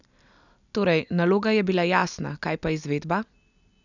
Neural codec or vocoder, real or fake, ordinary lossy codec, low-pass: none; real; none; 7.2 kHz